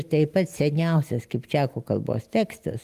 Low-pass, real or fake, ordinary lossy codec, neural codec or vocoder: 14.4 kHz; real; Opus, 32 kbps; none